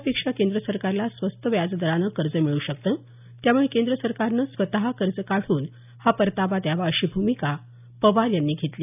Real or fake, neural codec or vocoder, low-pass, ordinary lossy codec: real; none; 3.6 kHz; none